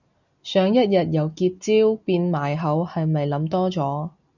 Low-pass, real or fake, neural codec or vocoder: 7.2 kHz; real; none